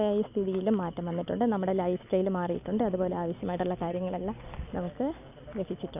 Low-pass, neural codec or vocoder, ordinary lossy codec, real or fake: 3.6 kHz; none; none; real